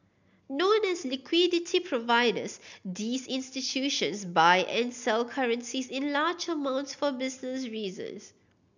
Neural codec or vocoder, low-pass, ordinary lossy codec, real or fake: none; 7.2 kHz; none; real